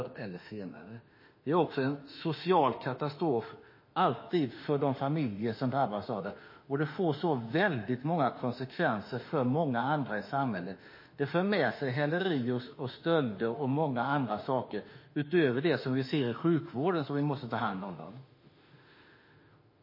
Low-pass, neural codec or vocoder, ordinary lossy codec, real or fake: 5.4 kHz; autoencoder, 48 kHz, 32 numbers a frame, DAC-VAE, trained on Japanese speech; MP3, 24 kbps; fake